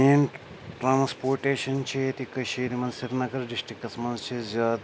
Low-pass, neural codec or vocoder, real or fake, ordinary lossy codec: none; none; real; none